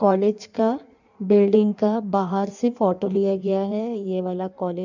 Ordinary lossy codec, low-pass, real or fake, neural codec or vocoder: none; 7.2 kHz; fake; codec, 16 kHz in and 24 kHz out, 1.1 kbps, FireRedTTS-2 codec